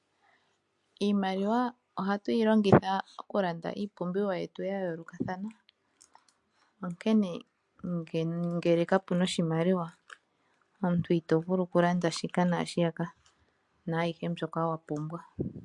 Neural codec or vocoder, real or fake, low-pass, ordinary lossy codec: none; real; 10.8 kHz; MP3, 64 kbps